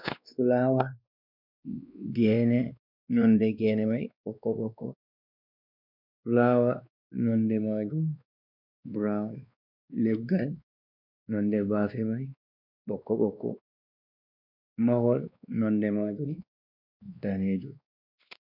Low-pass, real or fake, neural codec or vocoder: 5.4 kHz; fake; codec, 16 kHz, 2 kbps, X-Codec, WavLM features, trained on Multilingual LibriSpeech